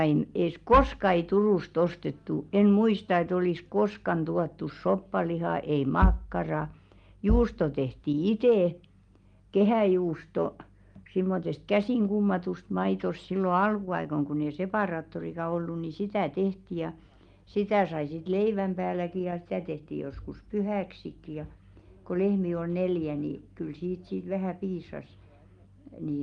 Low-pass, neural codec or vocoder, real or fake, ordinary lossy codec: 9.9 kHz; none; real; Opus, 32 kbps